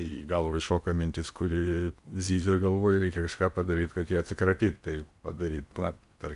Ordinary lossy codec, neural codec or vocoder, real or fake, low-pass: MP3, 96 kbps; codec, 16 kHz in and 24 kHz out, 0.8 kbps, FocalCodec, streaming, 65536 codes; fake; 10.8 kHz